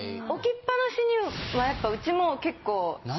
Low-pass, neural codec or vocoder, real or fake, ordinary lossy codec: 7.2 kHz; none; real; MP3, 24 kbps